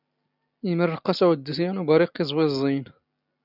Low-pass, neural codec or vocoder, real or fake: 5.4 kHz; none; real